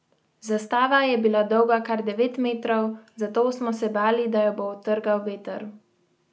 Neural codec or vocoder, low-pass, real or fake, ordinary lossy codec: none; none; real; none